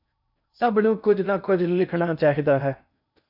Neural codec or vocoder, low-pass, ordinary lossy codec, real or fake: codec, 16 kHz in and 24 kHz out, 0.6 kbps, FocalCodec, streaming, 2048 codes; 5.4 kHz; AAC, 48 kbps; fake